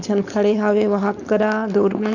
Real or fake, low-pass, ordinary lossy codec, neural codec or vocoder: fake; 7.2 kHz; none; codec, 16 kHz, 4.8 kbps, FACodec